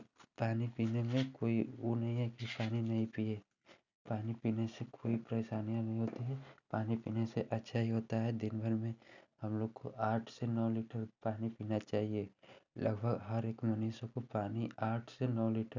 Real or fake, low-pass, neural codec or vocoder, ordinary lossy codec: real; 7.2 kHz; none; none